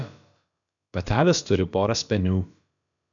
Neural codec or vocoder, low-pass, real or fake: codec, 16 kHz, about 1 kbps, DyCAST, with the encoder's durations; 7.2 kHz; fake